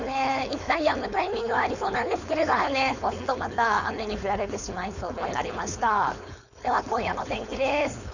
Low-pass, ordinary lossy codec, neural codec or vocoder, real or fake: 7.2 kHz; none; codec, 16 kHz, 4.8 kbps, FACodec; fake